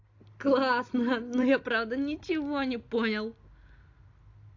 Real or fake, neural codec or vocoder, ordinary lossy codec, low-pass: real; none; AAC, 48 kbps; 7.2 kHz